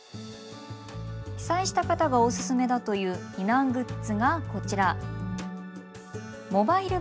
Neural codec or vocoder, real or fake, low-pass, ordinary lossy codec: none; real; none; none